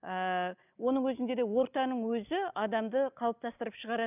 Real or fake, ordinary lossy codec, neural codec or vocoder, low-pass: real; none; none; 3.6 kHz